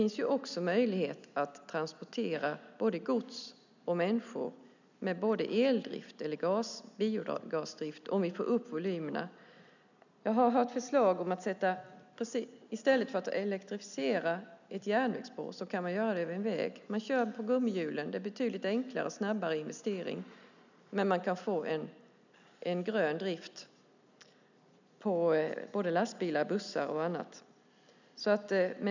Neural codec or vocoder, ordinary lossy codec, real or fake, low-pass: none; none; real; 7.2 kHz